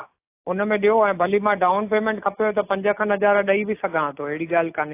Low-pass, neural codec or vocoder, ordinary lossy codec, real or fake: 3.6 kHz; none; AAC, 24 kbps; real